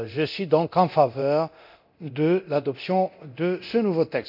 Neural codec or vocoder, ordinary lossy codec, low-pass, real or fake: codec, 24 kHz, 0.9 kbps, DualCodec; none; 5.4 kHz; fake